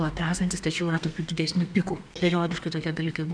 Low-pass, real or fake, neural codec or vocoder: 9.9 kHz; fake; codec, 32 kHz, 1.9 kbps, SNAC